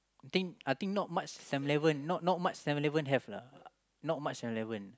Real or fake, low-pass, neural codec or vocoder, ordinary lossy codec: real; none; none; none